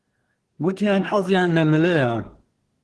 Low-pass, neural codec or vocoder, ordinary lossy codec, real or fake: 10.8 kHz; codec, 24 kHz, 1 kbps, SNAC; Opus, 16 kbps; fake